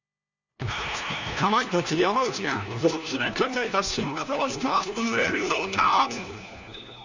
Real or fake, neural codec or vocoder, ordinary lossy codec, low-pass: fake; codec, 16 kHz, 1 kbps, FunCodec, trained on LibriTTS, 50 frames a second; none; 7.2 kHz